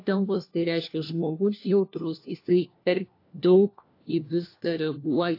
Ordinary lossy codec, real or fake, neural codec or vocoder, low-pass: AAC, 32 kbps; fake; codec, 16 kHz, 1 kbps, FunCodec, trained on LibriTTS, 50 frames a second; 5.4 kHz